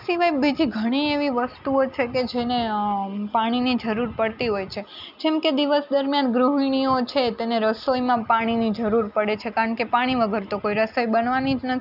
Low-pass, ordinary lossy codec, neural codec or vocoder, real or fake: 5.4 kHz; none; none; real